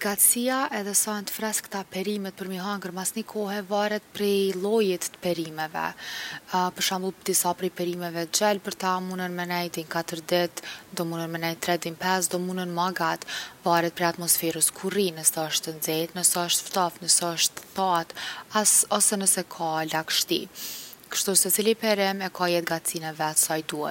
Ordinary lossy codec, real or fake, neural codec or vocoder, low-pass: none; real; none; 19.8 kHz